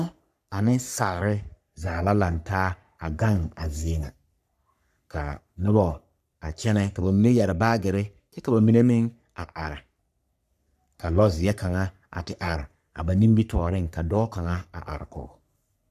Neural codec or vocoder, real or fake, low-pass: codec, 44.1 kHz, 3.4 kbps, Pupu-Codec; fake; 14.4 kHz